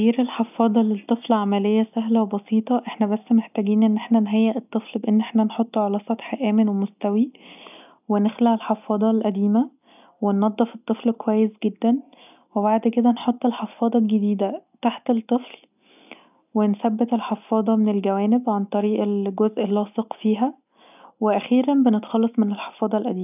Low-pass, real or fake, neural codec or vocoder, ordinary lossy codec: 3.6 kHz; real; none; none